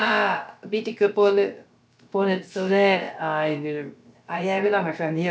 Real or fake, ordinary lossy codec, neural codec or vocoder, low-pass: fake; none; codec, 16 kHz, about 1 kbps, DyCAST, with the encoder's durations; none